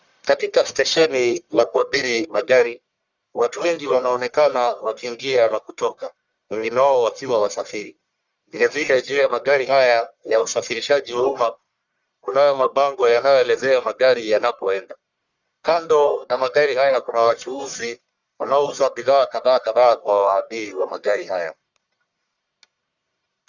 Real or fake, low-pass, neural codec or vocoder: fake; 7.2 kHz; codec, 44.1 kHz, 1.7 kbps, Pupu-Codec